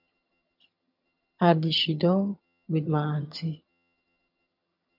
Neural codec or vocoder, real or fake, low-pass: vocoder, 22.05 kHz, 80 mel bands, HiFi-GAN; fake; 5.4 kHz